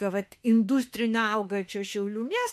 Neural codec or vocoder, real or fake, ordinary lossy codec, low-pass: autoencoder, 48 kHz, 32 numbers a frame, DAC-VAE, trained on Japanese speech; fake; MP3, 64 kbps; 14.4 kHz